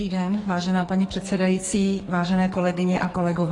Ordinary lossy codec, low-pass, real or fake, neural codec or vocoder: AAC, 32 kbps; 10.8 kHz; fake; codec, 44.1 kHz, 2.6 kbps, SNAC